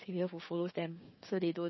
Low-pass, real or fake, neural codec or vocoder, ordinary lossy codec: 7.2 kHz; fake; codec, 16 kHz, 6 kbps, DAC; MP3, 24 kbps